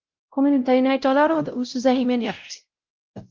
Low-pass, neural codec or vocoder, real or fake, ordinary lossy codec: 7.2 kHz; codec, 16 kHz, 0.5 kbps, X-Codec, WavLM features, trained on Multilingual LibriSpeech; fake; Opus, 32 kbps